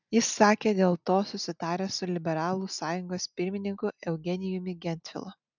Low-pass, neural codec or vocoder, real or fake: 7.2 kHz; none; real